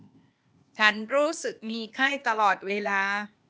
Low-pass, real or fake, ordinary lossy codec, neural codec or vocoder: none; fake; none; codec, 16 kHz, 0.8 kbps, ZipCodec